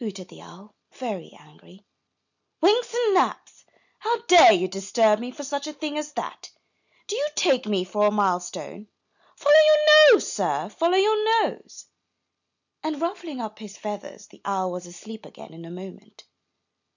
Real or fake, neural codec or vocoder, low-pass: real; none; 7.2 kHz